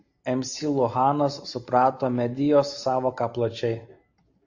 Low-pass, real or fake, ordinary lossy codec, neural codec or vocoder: 7.2 kHz; real; AAC, 48 kbps; none